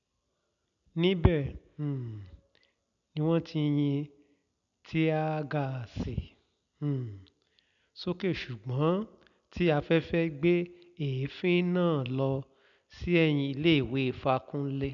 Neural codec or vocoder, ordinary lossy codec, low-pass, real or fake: none; none; 7.2 kHz; real